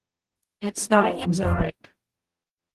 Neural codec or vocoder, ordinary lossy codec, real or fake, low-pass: codec, 44.1 kHz, 0.9 kbps, DAC; Opus, 24 kbps; fake; 14.4 kHz